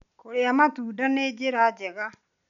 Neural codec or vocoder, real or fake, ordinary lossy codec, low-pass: none; real; none; 7.2 kHz